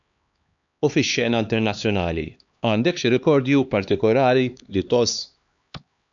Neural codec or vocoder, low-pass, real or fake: codec, 16 kHz, 2 kbps, X-Codec, HuBERT features, trained on LibriSpeech; 7.2 kHz; fake